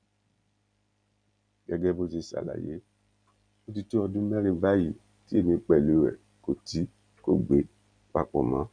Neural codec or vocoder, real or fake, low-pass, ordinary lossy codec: none; real; 9.9 kHz; none